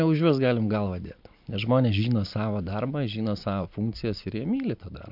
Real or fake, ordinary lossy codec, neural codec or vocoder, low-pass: real; MP3, 48 kbps; none; 5.4 kHz